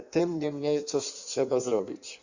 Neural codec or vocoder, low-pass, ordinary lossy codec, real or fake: codec, 16 kHz in and 24 kHz out, 1.1 kbps, FireRedTTS-2 codec; 7.2 kHz; Opus, 64 kbps; fake